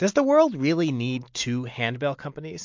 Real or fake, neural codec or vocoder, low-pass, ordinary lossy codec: real; none; 7.2 kHz; MP3, 48 kbps